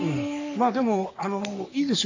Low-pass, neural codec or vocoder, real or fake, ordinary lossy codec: 7.2 kHz; codec, 44.1 kHz, 3.4 kbps, Pupu-Codec; fake; AAC, 48 kbps